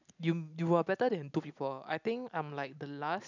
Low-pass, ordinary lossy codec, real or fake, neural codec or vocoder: 7.2 kHz; none; real; none